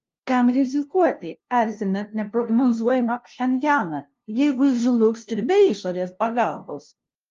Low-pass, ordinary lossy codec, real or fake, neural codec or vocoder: 7.2 kHz; Opus, 32 kbps; fake; codec, 16 kHz, 0.5 kbps, FunCodec, trained on LibriTTS, 25 frames a second